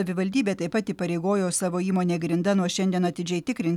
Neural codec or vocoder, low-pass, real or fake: none; 19.8 kHz; real